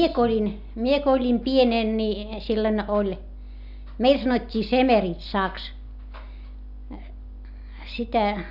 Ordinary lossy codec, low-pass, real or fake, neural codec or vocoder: none; 5.4 kHz; real; none